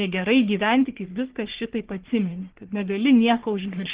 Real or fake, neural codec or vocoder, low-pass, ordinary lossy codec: fake; codec, 44.1 kHz, 3.4 kbps, Pupu-Codec; 3.6 kHz; Opus, 16 kbps